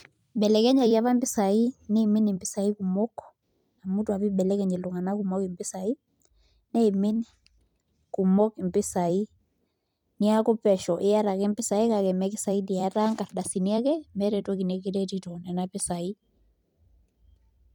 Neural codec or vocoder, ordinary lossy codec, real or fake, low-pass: vocoder, 44.1 kHz, 128 mel bands every 256 samples, BigVGAN v2; none; fake; 19.8 kHz